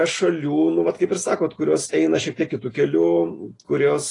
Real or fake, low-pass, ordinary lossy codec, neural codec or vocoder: real; 10.8 kHz; AAC, 32 kbps; none